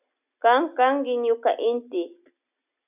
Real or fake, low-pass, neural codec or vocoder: real; 3.6 kHz; none